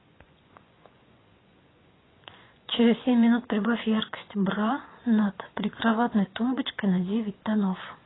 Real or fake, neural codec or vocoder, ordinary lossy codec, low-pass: fake; vocoder, 24 kHz, 100 mel bands, Vocos; AAC, 16 kbps; 7.2 kHz